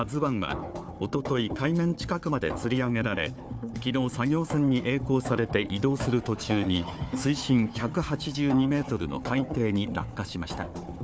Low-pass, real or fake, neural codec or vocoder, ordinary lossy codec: none; fake; codec, 16 kHz, 4 kbps, FunCodec, trained on Chinese and English, 50 frames a second; none